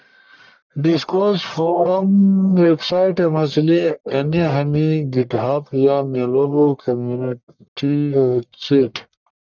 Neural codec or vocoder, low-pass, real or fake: codec, 44.1 kHz, 1.7 kbps, Pupu-Codec; 7.2 kHz; fake